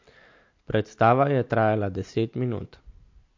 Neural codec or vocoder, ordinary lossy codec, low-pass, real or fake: none; MP3, 48 kbps; 7.2 kHz; real